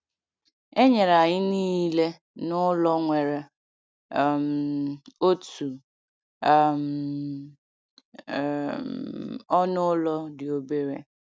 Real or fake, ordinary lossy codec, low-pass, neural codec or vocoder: real; none; none; none